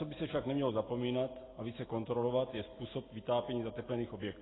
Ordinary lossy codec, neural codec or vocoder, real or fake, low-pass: AAC, 16 kbps; none; real; 7.2 kHz